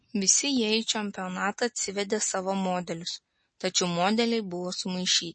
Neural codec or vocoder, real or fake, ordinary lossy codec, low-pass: none; real; MP3, 32 kbps; 9.9 kHz